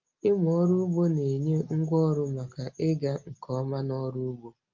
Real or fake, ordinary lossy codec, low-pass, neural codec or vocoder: real; Opus, 32 kbps; 7.2 kHz; none